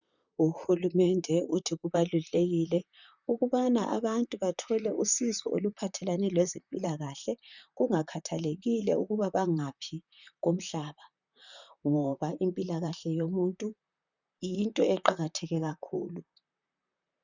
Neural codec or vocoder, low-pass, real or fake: vocoder, 44.1 kHz, 128 mel bands, Pupu-Vocoder; 7.2 kHz; fake